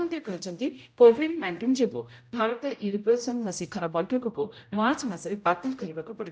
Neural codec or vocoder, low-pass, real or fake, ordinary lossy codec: codec, 16 kHz, 0.5 kbps, X-Codec, HuBERT features, trained on general audio; none; fake; none